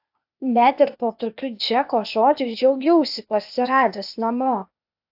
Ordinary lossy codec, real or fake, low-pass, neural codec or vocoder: AAC, 48 kbps; fake; 5.4 kHz; codec, 16 kHz, 0.8 kbps, ZipCodec